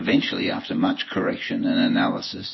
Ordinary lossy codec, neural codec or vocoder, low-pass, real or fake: MP3, 24 kbps; vocoder, 24 kHz, 100 mel bands, Vocos; 7.2 kHz; fake